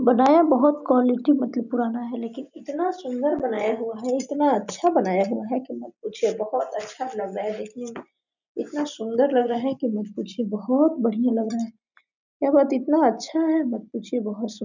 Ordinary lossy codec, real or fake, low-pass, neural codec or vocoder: none; real; 7.2 kHz; none